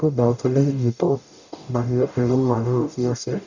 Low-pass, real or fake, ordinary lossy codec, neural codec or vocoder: 7.2 kHz; fake; none; codec, 44.1 kHz, 0.9 kbps, DAC